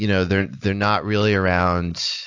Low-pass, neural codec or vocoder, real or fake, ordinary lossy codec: 7.2 kHz; none; real; AAC, 48 kbps